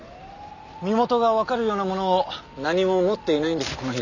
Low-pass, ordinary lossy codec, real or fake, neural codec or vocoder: 7.2 kHz; none; real; none